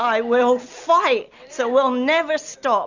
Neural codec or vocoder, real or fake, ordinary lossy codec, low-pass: none; real; Opus, 64 kbps; 7.2 kHz